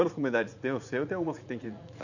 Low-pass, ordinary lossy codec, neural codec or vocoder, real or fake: 7.2 kHz; none; vocoder, 44.1 kHz, 80 mel bands, Vocos; fake